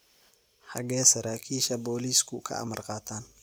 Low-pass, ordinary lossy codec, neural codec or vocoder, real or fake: none; none; none; real